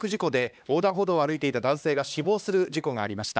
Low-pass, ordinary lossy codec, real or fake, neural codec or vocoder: none; none; fake; codec, 16 kHz, 2 kbps, X-Codec, HuBERT features, trained on LibriSpeech